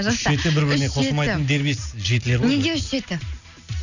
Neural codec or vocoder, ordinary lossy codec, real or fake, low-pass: none; none; real; 7.2 kHz